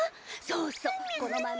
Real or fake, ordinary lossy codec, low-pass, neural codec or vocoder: real; none; none; none